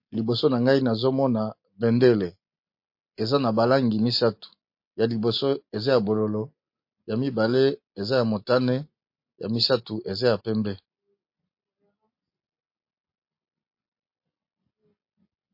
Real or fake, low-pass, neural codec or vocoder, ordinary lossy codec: real; 5.4 kHz; none; MP3, 32 kbps